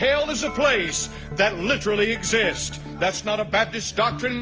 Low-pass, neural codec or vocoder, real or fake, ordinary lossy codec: 7.2 kHz; none; real; Opus, 24 kbps